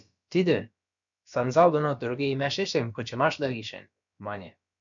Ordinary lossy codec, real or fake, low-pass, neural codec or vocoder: MP3, 96 kbps; fake; 7.2 kHz; codec, 16 kHz, about 1 kbps, DyCAST, with the encoder's durations